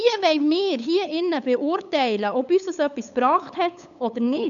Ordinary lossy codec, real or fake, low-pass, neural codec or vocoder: none; fake; 7.2 kHz; codec, 16 kHz, 8 kbps, FunCodec, trained on LibriTTS, 25 frames a second